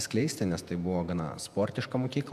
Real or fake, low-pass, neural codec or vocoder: fake; 14.4 kHz; vocoder, 48 kHz, 128 mel bands, Vocos